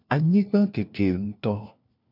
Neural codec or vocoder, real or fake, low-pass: codec, 16 kHz, 1 kbps, FunCodec, trained on LibriTTS, 50 frames a second; fake; 5.4 kHz